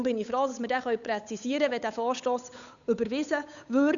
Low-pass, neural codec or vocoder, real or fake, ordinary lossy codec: 7.2 kHz; codec, 16 kHz, 8 kbps, FunCodec, trained on Chinese and English, 25 frames a second; fake; none